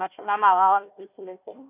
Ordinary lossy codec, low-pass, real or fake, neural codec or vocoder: none; 3.6 kHz; fake; codec, 16 kHz, 1 kbps, FunCodec, trained on Chinese and English, 50 frames a second